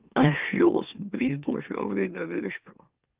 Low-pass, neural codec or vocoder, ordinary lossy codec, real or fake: 3.6 kHz; autoencoder, 44.1 kHz, a latent of 192 numbers a frame, MeloTTS; Opus, 32 kbps; fake